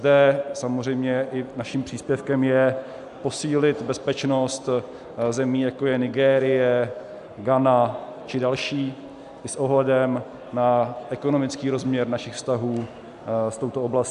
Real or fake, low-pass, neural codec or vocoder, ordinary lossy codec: real; 10.8 kHz; none; AAC, 96 kbps